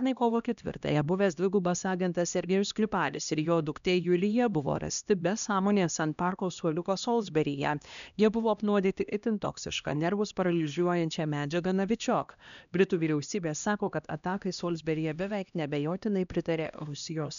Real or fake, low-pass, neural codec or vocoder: fake; 7.2 kHz; codec, 16 kHz, 1 kbps, X-Codec, HuBERT features, trained on LibriSpeech